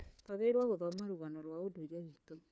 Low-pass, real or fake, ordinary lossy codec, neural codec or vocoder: none; fake; none; codec, 16 kHz, 4 kbps, FunCodec, trained on LibriTTS, 50 frames a second